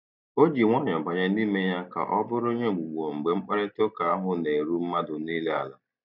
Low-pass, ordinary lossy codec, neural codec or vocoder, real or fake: 5.4 kHz; none; none; real